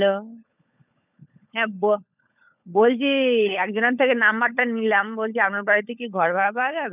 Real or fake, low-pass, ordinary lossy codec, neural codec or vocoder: fake; 3.6 kHz; none; codec, 16 kHz, 16 kbps, FunCodec, trained on LibriTTS, 50 frames a second